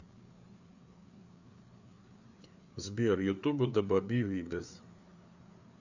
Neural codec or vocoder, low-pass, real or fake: codec, 16 kHz, 4 kbps, FreqCodec, larger model; 7.2 kHz; fake